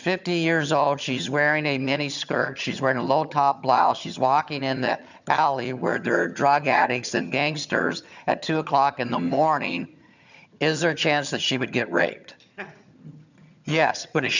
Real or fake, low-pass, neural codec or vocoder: fake; 7.2 kHz; vocoder, 22.05 kHz, 80 mel bands, HiFi-GAN